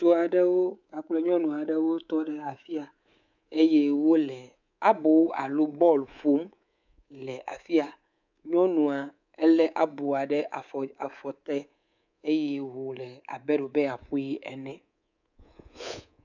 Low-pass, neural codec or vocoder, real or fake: 7.2 kHz; codec, 24 kHz, 3.1 kbps, DualCodec; fake